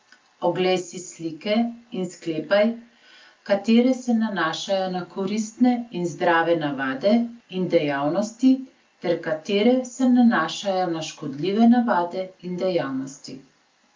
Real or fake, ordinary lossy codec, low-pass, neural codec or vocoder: real; Opus, 24 kbps; 7.2 kHz; none